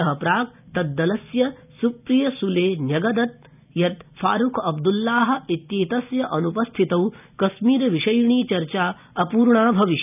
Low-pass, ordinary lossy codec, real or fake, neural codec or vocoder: 3.6 kHz; none; real; none